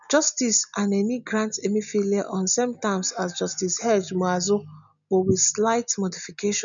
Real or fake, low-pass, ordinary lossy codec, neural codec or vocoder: real; 7.2 kHz; none; none